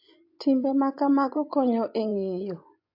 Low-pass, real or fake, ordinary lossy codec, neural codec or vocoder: 5.4 kHz; fake; AAC, 32 kbps; vocoder, 24 kHz, 100 mel bands, Vocos